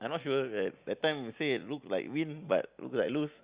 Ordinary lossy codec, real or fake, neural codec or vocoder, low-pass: Opus, 64 kbps; real; none; 3.6 kHz